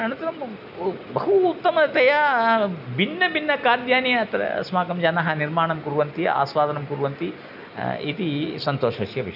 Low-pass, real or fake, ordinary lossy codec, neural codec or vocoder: 5.4 kHz; real; none; none